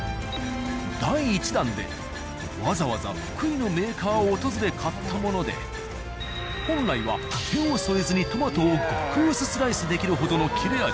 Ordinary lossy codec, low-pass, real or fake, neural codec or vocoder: none; none; real; none